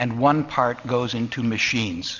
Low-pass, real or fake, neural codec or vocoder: 7.2 kHz; real; none